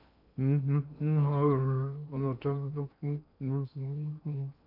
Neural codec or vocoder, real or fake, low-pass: codec, 16 kHz in and 24 kHz out, 0.8 kbps, FocalCodec, streaming, 65536 codes; fake; 5.4 kHz